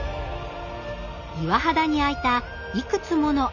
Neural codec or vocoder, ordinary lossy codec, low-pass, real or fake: none; none; 7.2 kHz; real